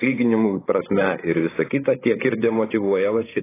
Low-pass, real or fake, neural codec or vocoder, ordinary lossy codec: 3.6 kHz; fake; codec, 16 kHz, 8 kbps, FreqCodec, larger model; AAC, 16 kbps